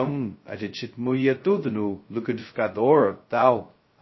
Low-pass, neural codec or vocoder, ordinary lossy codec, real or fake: 7.2 kHz; codec, 16 kHz, 0.2 kbps, FocalCodec; MP3, 24 kbps; fake